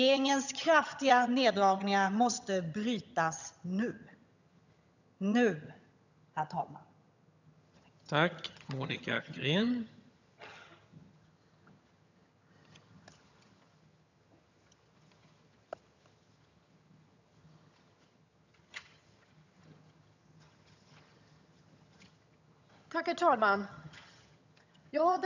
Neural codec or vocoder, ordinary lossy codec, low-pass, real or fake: vocoder, 22.05 kHz, 80 mel bands, HiFi-GAN; none; 7.2 kHz; fake